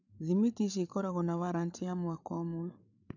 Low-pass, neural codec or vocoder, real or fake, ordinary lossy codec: 7.2 kHz; codec, 16 kHz, 8 kbps, FreqCodec, larger model; fake; none